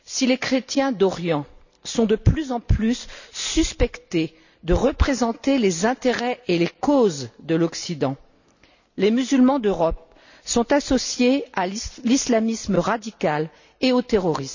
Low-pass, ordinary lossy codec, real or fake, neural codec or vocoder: 7.2 kHz; none; real; none